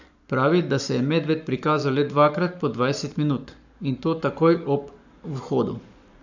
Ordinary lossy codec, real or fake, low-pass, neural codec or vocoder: none; fake; 7.2 kHz; codec, 44.1 kHz, 7.8 kbps, Pupu-Codec